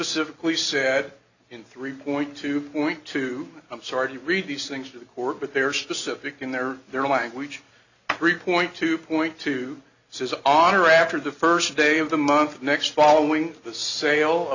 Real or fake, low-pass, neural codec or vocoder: real; 7.2 kHz; none